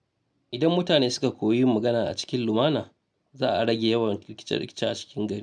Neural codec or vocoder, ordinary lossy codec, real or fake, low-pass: none; none; real; none